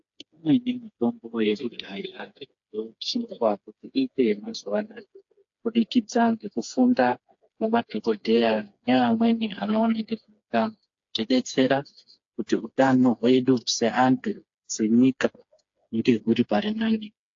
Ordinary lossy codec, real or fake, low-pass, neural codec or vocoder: AAC, 48 kbps; fake; 7.2 kHz; codec, 16 kHz, 4 kbps, FreqCodec, smaller model